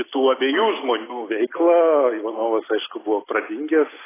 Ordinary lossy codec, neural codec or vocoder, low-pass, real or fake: AAC, 16 kbps; none; 3.6 kHz; real